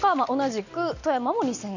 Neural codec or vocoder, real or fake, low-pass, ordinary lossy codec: none; real; 7.2 kHz; none